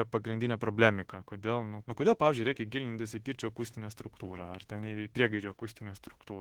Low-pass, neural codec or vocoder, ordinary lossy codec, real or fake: 19.8 kHz; autoencoder, 48 kHz, 32 numbers a frame, DAC-VAE, trained on Japanese speech; Opus, 16 kbps; fake